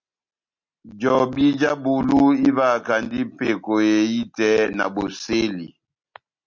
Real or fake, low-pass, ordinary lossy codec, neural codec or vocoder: real; 7.2 kHz; MP3, 48 kbps; none